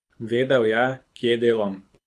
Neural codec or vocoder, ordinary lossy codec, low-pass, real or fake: codec, 24 kHz, 6 kbps, HILCodec; none; none; fake